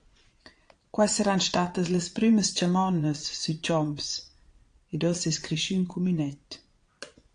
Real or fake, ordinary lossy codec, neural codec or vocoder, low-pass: fake; AAC, 48 kbps; vocoder, 22.05 kHz, 80 mel bands, Vocos; 9.9 kHz